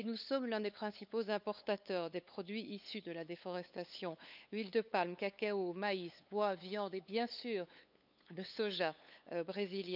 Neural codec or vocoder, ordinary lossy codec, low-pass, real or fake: codec, 16 kHz, 8 kbps, FunCodec, trained on LibriTTS, 25 frames a second; none; 5.4 kHz; fake